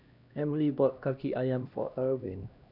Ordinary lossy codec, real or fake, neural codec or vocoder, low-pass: none; fake; codec, 16 kHz, 1 kbps, X-Codec, HuBERT features, trained on LibriSpeech; 5.4 kHz